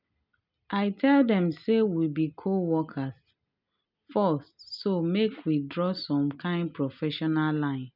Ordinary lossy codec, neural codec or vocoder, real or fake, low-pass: none; none; real; 5.4 kHz